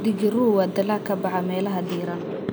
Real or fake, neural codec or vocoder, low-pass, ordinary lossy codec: real; none; none; none